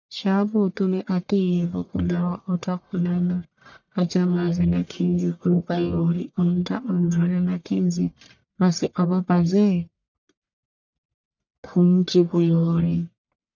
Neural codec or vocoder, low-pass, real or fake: codec, 44.1 kHz, 1.7 kbps, Pupu-Codec; 7.2 kHz; fake